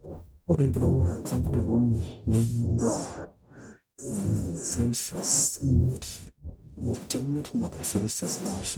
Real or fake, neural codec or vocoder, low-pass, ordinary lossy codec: fake; codec, 44.1 kHz, 0.9 kbps, DAC; none; none